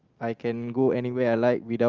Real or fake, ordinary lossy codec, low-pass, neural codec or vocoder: real; Opus, 32 kbps; 7.2 kHz; none